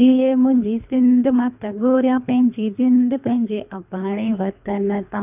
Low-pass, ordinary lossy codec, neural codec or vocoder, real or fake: 3.6 kHz; none; codec, 24 kHz, 3 kbps, HILCodec; fake